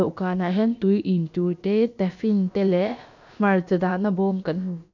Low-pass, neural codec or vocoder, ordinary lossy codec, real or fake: 7.2 kHz; codec, 16 kHz, about 1 kbps, DyCAST, with the encoder's durations; none; fake